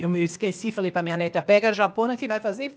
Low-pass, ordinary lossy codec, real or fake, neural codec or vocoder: none; none; fake; codec, 16 kHz, 0.8 kbps, ZipCodec